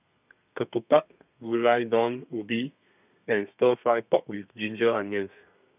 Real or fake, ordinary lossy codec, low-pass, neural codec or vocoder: fake; none; 3.6 kHz; codec, 32 kHz, 1.9 kbps, SNAC